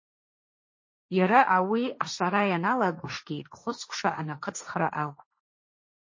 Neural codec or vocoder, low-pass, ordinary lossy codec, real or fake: codec, 16 kHz, 1.1 kbps, Voila-Tokenizer; 7.2 kHz; MP3, 32 kbps; fake